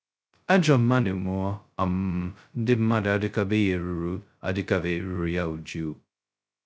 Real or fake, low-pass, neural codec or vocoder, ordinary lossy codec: fake; none; codec, 16 kHz, 0.2 kbps, FocalCodec; none